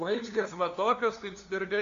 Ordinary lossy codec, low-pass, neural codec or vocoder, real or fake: AAC, 48 kbps; 7.2 kHz; codec, 16 kHz, 2 kbps, FunCodec, trained on LibriTTS, 25 frames a second; fake